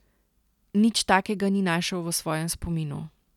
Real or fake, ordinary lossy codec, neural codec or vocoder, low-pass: real; none; none; 19.8 kHz